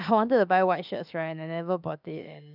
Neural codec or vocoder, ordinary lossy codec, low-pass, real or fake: autoencoder, 48 kHz, 32 numbers a frame, DAC-VAE, trained on Japanese speech; none; 5.4 kHz; fake